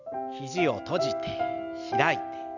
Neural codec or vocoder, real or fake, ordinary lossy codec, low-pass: none; real; none; 7.2 kHz